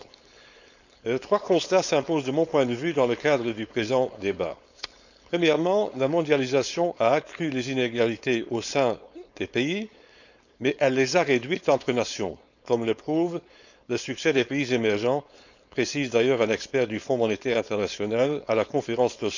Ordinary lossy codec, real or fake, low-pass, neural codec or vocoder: none; fake; 7.2 kHz; codec, 16 kHz, 4.8 kbps, FACodec